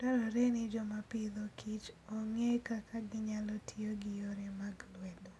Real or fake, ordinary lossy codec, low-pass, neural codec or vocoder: real; none; none; none